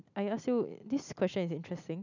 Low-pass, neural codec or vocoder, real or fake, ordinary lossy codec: 7.2 kHz; none; real; none